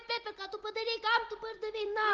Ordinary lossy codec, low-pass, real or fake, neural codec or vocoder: Opus, 16 kbps; 7.2 kHz; real; none